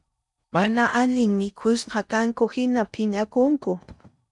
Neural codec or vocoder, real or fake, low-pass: codec, 16 kHz in and 24 kHz out, 0.6 kbps, FocalCodec, streaming, 4096 codes; fake; 10.8 kHz